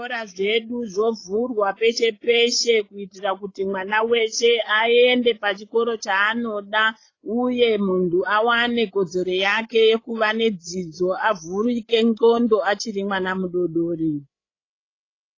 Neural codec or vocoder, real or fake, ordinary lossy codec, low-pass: codec, 16 kHz, 16 kbps, FreqCodec, larger model; fake; AAC, 32 kbps; 7.2 kHz